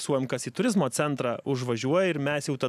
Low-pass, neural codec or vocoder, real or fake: 14.4 kHz; none; real